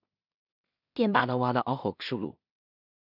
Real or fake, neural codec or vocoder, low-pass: fake; codec, 16 kHz in and 24 kHz out, 0.4 kbps, LongCat-Audio-Codec, two codebook decoder; 5.4 kHz